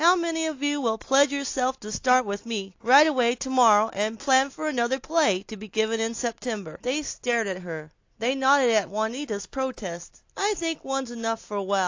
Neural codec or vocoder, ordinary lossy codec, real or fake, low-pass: none; AAC, 48 kbps; real; 7.2 kHz